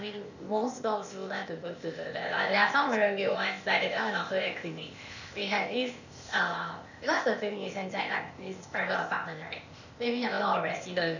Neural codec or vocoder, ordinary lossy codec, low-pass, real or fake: codec, 16 kHz, 0.8 kbps, ZipCodec; none; 7.2 kHz; fake